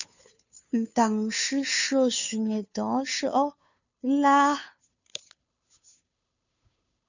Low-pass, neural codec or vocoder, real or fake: 7.2 kHz; codec, 16 kHz, 2 kbps, FunCodec, trained on Chinese and English, 25 frames a second; fake